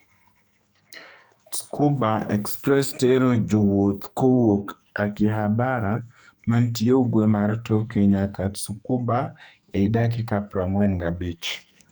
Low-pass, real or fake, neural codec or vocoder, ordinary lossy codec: none; fake; codec, 44.1 kHz, 2.6 kbps, SNAC; none